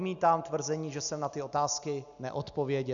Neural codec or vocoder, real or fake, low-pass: none; real; 7.2 kHz